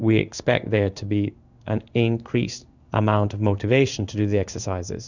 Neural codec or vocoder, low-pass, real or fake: codec, 16 kHz in and 24 kHz out, 1 kbps, XY-Tokenizer; 7.2 kHz; fake